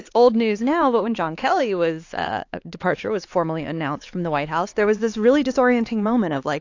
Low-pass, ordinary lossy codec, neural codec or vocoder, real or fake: 7.2 kHz; AAC, 48 kbps; codec, 16 kHz, 4 kbps, X-Codec, HuBERT features, trained on LibriSpeech; fake